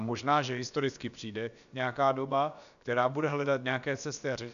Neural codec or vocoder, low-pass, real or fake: codec, 16 kHz, about 1 kbps, DyCAST, with the encoder's durations; 7.2 kHz; fake